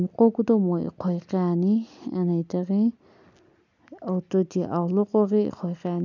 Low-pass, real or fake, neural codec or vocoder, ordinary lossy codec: 7.2 kHz; real; none; none